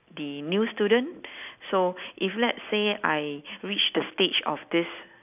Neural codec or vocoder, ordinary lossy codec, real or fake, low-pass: none; none; real; 3.6 kHz